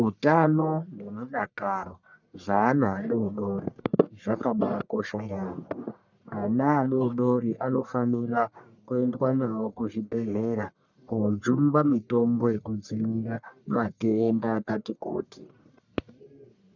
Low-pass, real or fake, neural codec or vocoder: 7.2 kHz; fake; codec, 44.1 kHz, 1.7 kbps, Pupu-Codec